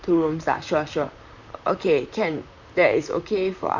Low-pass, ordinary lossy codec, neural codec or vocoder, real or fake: 7.2 kHz; none; vocoder, 44.1 kHz, 128 mel bands, Pupu-Vocoder; fake